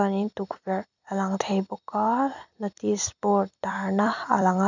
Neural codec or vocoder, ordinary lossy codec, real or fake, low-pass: vocoder, 44.1 kHz, 128 mel bands every 256 samples, BigVGAN v2; none; fake; 7.2 kHz